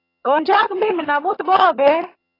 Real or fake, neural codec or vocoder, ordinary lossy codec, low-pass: fake; vocoder, 22.05 kHz, 80 mel bands, HiFi-GAN; AAC, 24 kbps; 5.4 kHz